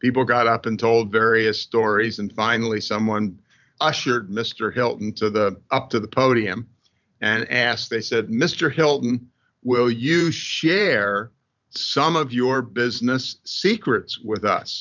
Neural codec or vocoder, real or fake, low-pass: vocoder, 44.1 kHz, 128 mel bands every 512 samples, BigVGAN v2; fake; 7.2 kHz